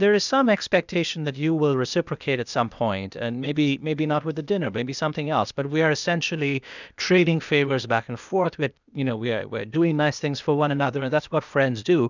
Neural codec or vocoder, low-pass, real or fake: codec, 16 kHz, 0.8 kbps, ZipCodec; 7.2 kHz; fake